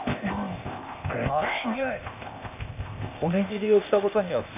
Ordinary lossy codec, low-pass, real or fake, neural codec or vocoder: none; 3.6 kHz; fake; codec, 16 kHz, 0.8 kbps, ZipCodec